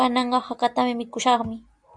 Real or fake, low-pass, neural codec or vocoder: real; 9.9 kHz; none